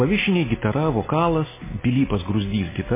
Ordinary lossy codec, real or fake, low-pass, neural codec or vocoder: MP3, 16 kbps; real; 3.6 kHz; none